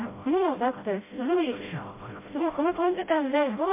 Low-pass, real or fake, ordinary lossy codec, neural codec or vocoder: 3.6 kHz; fake; MP3, 24 kbps; codec, 16 kHz, 0.5 kbps, FreqCodec, smaller model